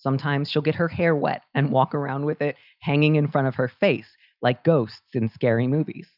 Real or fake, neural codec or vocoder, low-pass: real; none; 5.4 kHz